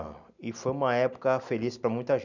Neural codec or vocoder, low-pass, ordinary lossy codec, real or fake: none; 7.2 kHz; none; real